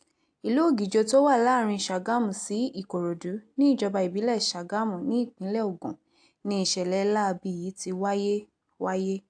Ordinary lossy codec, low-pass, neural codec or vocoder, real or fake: AAC, 64 kbps; 9.9 kHz; none; real